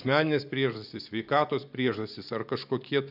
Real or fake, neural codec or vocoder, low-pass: fake; vocoder, 24 kHz, 100 mel bands, Vocos; 5.4 kHz